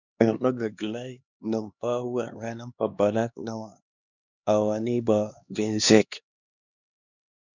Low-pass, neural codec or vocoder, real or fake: 7.2 kHz; codec, 16 kHz, 2 kbps, X-Codec, HuBERT features, trained on LibriSpeech; fake